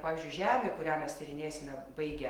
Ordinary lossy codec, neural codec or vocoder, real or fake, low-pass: Opus, 24 kbps; autoencoder, 48 kHz, 128 numbers a frame, DAC-VAE, trained on Japanese speech; fake; 19.8 kHz